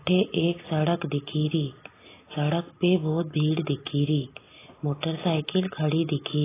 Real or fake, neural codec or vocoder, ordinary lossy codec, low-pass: real; none; AAC, 16 kbps; 3.6 kHz